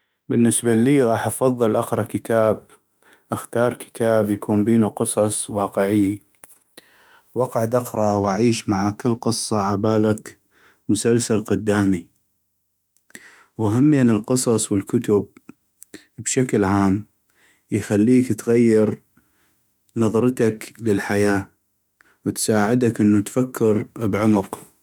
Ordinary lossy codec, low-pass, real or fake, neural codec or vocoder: none; none; fake; autoencoder, 48 kHz, 32 numbers a frame, DAC-VAE, trained on Japanese speech